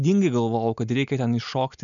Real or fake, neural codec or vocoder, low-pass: real; none; 7.2 kHz